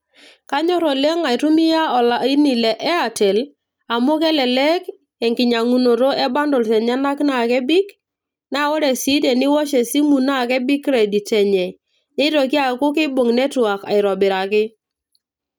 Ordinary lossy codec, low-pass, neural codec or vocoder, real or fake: none; none; none; real